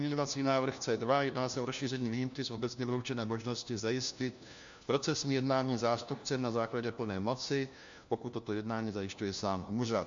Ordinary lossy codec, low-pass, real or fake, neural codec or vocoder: MP3, 64 kbps; 7.2 kHz; fake; codec, 16 kHz, 1 kbps, FunCodec, trained on LibriTTS, 50 frames a second